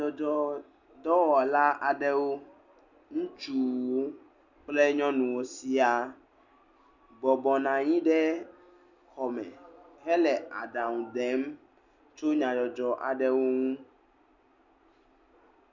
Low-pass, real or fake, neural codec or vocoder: 7.2 kHz; real; none